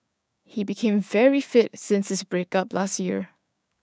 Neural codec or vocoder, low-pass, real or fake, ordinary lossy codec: codec, 16 kHz, 6 kbps, DAC; none; fake; none